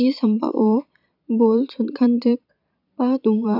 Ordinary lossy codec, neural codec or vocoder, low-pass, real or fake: none; vocoder, 22.05 kHz, 80 mel bands, Vocos; 5.4 kHz; fake